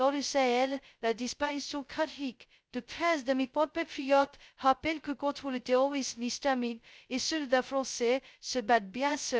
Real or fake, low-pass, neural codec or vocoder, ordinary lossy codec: fake; none; codec, 16 kHz, 0.2 kbps, FocalCodec; none